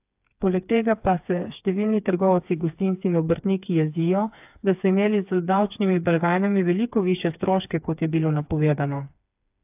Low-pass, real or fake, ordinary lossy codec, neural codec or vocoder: 3.6 kHz; fake; AAC, 32 kbps; codec, 16 kHz, 4 kbps, FreqCodec, smaller model